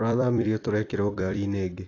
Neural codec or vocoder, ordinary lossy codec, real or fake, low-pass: vocoder, 44.1 kHz, 128 mel bands every 256 samples, BigVGAN v2; AAC, 32 kbps; fake; 7.2 kHz